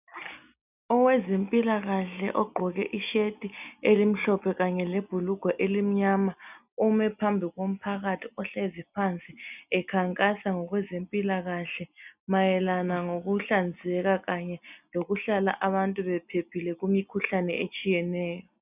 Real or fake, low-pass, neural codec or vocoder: real; 3.6 kHz; none